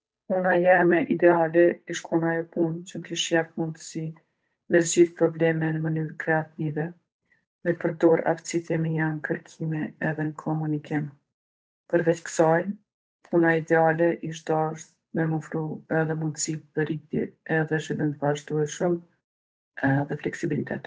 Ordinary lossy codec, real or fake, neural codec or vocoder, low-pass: none; fake; codec, 16 kHz, 8 kbps, FunCodec, trained on Chinese and English, 25 frames a second; none